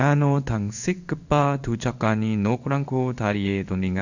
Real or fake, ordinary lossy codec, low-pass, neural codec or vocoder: fake; none; 7.2 kHz; codec, 16 kHz in and 24 kHz out, 1 kbps, XY-Tokenizer